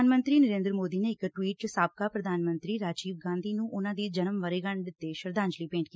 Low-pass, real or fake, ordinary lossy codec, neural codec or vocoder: none; real; none; none